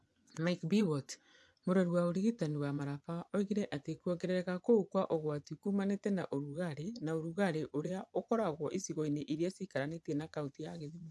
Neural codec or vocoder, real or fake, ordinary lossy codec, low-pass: vocoder, 24 kHz, 100 mel bands, Vocos; fake; none; none